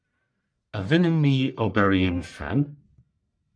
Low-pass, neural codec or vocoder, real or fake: 9.9 kHz; codec, 44.1 kHz, 1.7 kbps, Pupu-Codec; fake